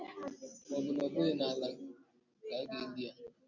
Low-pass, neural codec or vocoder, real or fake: 7.2 kHz; none; real